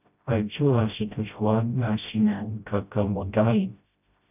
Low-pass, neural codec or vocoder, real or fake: 3.6 kHz; codec, 16 kHz, 0.5 kbps, FreqCodec, smaller model; fake